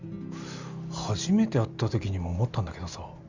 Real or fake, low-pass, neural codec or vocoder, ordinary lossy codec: real; 7.2 kHz; none; Opus, 64 kbps